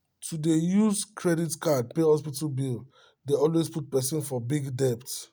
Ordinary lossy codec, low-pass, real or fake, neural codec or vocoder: none; none; real; none